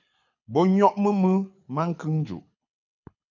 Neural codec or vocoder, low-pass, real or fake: codec, 24 kHz, 6 kbps, HILCodec; 7.2 kHz; fake